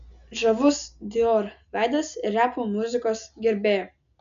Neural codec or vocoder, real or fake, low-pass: none; real; 7.2 kHz